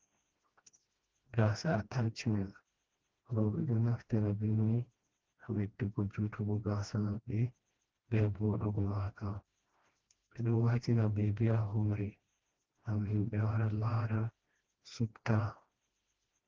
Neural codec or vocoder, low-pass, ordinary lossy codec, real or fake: codec, 16 kHz, 1 kbps, FreqCodec, smaller model; 7.2 kHz; Opus, 16 kbps; fake